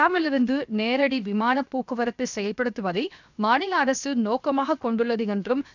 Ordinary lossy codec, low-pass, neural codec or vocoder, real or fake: none; 7.2 kHz; codec, 16 kHz, 0.7 kbps, FocalCodec; fake